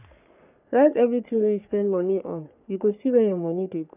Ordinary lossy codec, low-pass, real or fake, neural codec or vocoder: none; 3.6 kHz; fake; codec, 44.1 kHz, 3.4 kbps, Pupu-Codec